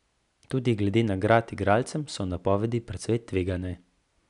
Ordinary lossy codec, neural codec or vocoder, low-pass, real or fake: none; none; 10.8 kHz; real